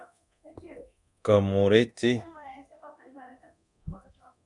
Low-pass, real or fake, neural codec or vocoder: 10.8 kHz; fake; codec, 24 kHz, 0.9 kbps, DualCodec